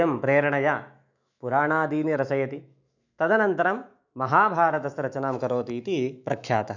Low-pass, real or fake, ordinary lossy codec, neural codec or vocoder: 7.2 kHz; fake; none; autoencoder, 48 kHz, 128 numbers a frame, DAC-VAE, trained on Japanese speech